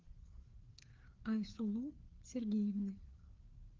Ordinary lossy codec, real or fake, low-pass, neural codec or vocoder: Opus, 16 kbps; fake; 7.2 kHz; codec, 16 kHz, 4 kbps, FreqCodec, larger model